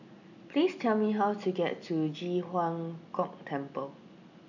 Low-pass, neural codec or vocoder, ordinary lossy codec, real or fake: 7.2 kHz; none; none; real